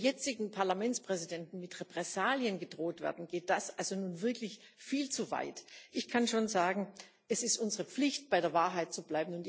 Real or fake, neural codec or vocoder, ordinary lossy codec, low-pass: real; none; none; none